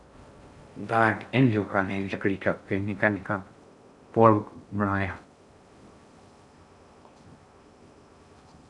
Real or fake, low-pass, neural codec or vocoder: fake; 10.8 kHz; codec, 16 kHz in and 24 kHz out, 0.6 kbps, FocalCodec, streaming, 4096 codes